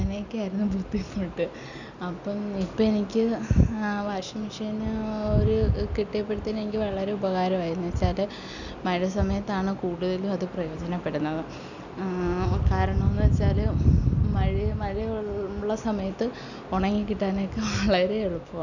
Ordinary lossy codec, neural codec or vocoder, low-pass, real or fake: none; none; 7.2 kHz; real